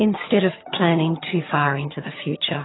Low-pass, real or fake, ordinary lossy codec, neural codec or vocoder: 7.2 kHz; fake; AAC, 16 kbps; vocoder, 22.05 kHz, 80 mel bands, HiFi-GAN